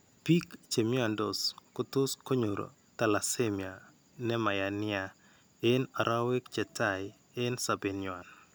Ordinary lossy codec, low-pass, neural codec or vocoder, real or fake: none; none; none; real